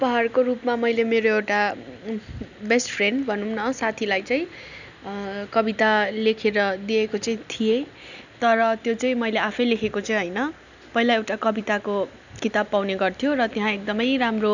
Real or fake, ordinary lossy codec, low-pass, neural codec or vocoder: real; none; 7.2 kHz; none